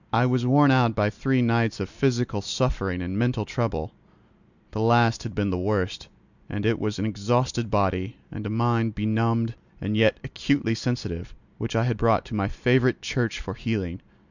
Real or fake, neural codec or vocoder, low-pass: real; none; 7.2 kHz